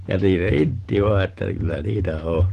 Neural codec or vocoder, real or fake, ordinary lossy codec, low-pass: vocoder, 44.1 kHz, 128 mel bands every 512 samples, BigVGAN v2; fake; Opus, 16 kbps; 14.4 kHz